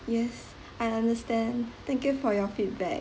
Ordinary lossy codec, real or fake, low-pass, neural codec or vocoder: none; real; none; none